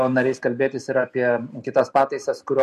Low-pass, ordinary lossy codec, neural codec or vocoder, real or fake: 14.4 kHz; MP3, 64 kbps; none; real